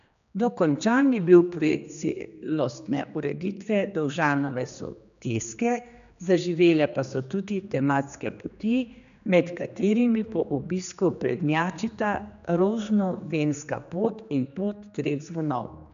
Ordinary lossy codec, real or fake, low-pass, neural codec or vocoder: none; fake; 7.2 kHz; codec, 16 kHz, 2 kbps, X-Codec, HuBERT features, trained on general audio